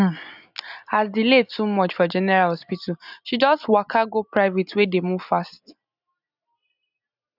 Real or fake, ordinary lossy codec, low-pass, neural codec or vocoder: real; none; 5.4 kHz; none